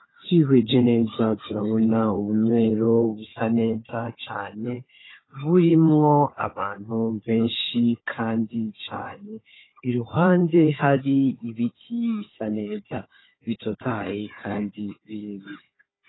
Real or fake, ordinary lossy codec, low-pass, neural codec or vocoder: fake; AAC, 16 kbps; 7.2 kHz; codec, 16 kHz, 4 kbps, FunCodec, trained on Chinese and English, 50 frames a second